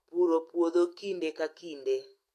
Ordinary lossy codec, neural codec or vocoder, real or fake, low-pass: AAC, 48 kbps; autoencoder, 48 kHz, 128 numbers a frame, DAC-VAE, trained on Japanese speech; fake; 14.4 kHz